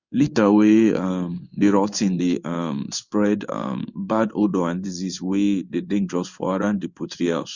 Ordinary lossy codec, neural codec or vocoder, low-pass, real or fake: Opus, 64 kbps; codec, 16 kHz in and 24 kHz out, 1 kbps, XY-Tokenizer; 7.2 kHz; fake